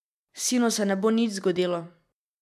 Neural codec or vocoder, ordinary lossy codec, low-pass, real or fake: none; none; 14.4 kHz; real